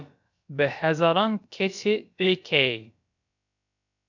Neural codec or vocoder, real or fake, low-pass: codec, 16 kHz, about 1 kbps, DyCAST, with the encoder's durations; fake; 7.2 kHz